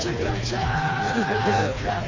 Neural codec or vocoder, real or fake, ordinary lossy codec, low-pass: codec, 16 kHz, 2 kbps, FreqCodec, smaller model; fake; AAC, 32 kbps; 7.2 kHz